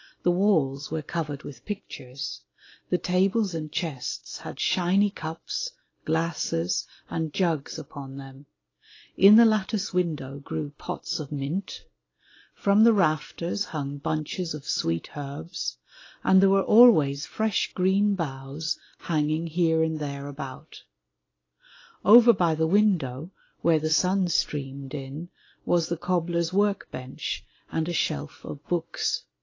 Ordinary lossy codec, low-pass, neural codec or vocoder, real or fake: AAC, 32 kbps; 7.2 kHz; none; real